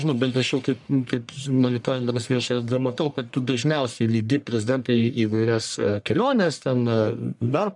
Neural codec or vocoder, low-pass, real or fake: codec, 44.1 kHz, 1.7 kbps, Pupu-Codec; 10.8 kHz; fake